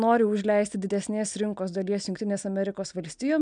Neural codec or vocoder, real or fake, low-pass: none; real; 9.9 kHz